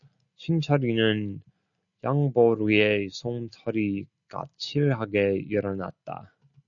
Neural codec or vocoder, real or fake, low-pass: none; real; 7.2 kHz